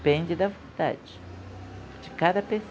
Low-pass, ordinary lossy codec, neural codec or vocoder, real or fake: none; none; none; real